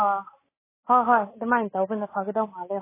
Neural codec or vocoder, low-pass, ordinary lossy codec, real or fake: none; 3.6 kHz; MP3, 16 kbps; real